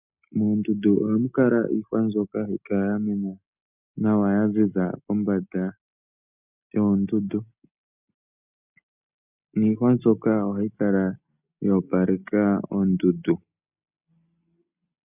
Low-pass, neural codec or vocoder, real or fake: 3.6 kHz; none; real